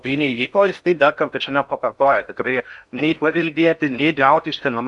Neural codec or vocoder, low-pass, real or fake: codec, 16 kHz in and 24 kHz out, 0.6 kbps, FocalCodec, streaming, 4096 codes; 10.8 kHz; fake